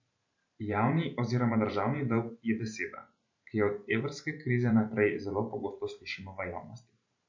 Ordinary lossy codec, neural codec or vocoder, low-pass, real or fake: MP3, 48 kbps; vocoder, 44.1 kHz, 128 mel bands every 512 samples, BigVGAN v2; 7.2 kHz; fake